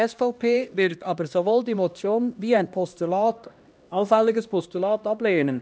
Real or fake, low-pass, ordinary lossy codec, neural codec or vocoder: fake; none; none; codec, 16 kHz, 1 kbps, X-Codec, HuBERT features, trained on LibriSpeech